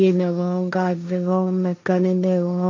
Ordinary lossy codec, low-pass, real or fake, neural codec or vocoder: MP3, 48 kbps; 7.2 kHz; fake; codec, 16 kHz, 1.1 kbps, Voila-Tokenizer